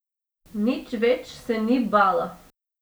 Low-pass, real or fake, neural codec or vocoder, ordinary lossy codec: none; real; none; none